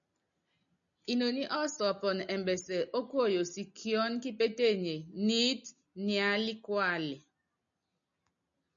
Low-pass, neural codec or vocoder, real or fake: 7.2 kHz; none; real